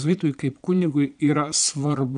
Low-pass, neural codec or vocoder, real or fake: 9.9 kHz; vocoder, 22.05 kHz, 80 mel bands, Vocos; fake